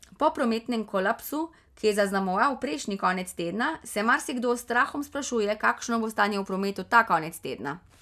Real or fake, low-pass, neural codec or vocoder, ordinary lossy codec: real; 14.4 kHz; none; none